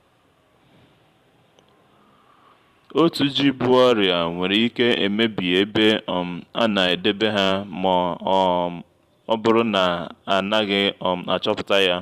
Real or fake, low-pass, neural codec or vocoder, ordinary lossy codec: fake; 14.4 kHz; vocoder, 44.1 kHz, 128 mel bands every 512 samples, BigVGAN v2; Opus, 64 kbps